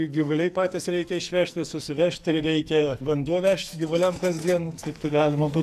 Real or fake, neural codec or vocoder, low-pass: fake; codec, 44.1 kHz, 2.6 kbps, SNAC; 14.4 kHz